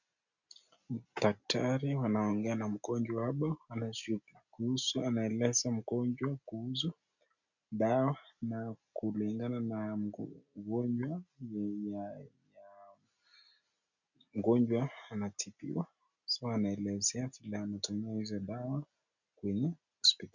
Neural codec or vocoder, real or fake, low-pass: none; real; 7.2 kHz